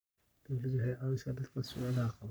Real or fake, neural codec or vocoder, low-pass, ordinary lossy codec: fake; codec, 44.1 kHz, 3.4 kbps, Pupu-Codec; none; none